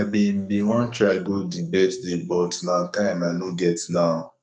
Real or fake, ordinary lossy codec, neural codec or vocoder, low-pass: fake; none; codec, 44.1 kHz, 2.6 kbps, SNAC; 9.9 kHz